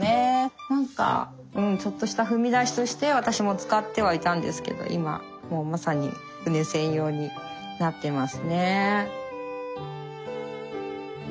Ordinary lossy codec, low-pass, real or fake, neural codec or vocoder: none; none; real; none